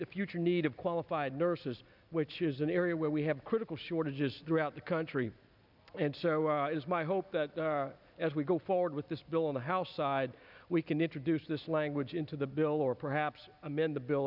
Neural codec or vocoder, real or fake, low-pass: none; real; 5.4 kHz